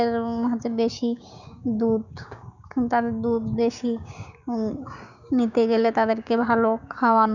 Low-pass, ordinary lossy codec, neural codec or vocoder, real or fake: 7.2 kHz; none; codec, 16 kHz, 6 kbps, DAC; fake